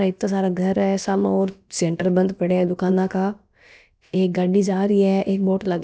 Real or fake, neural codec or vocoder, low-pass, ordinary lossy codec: fake; codec, 16 kHz, about 1 kbps, DyCAST, with the encoder's durations; none; none